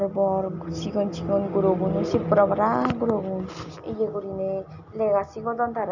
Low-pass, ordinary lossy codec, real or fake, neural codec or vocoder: 7.2 kHz; none; real; none